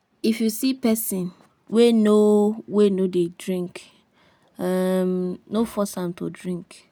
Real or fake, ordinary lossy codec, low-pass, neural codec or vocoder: real; none; none; none